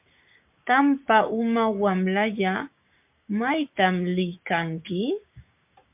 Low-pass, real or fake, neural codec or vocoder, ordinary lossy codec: 3.6 kHz; fake; codec, 16 kHz, 6 kbps, DAC; MP3, 32 kbps